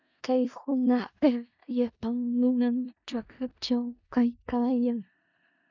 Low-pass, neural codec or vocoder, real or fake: 7.2 kHz; codec, 16 kHz in and 24 kHz out, 0.4 kbps, LongCat-Audio-Codec, four codebook decoder; fake